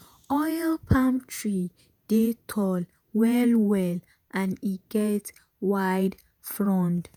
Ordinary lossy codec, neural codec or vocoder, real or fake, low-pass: none; vocoder, 48 kHz, 128 mel bands, Vocos; fake; none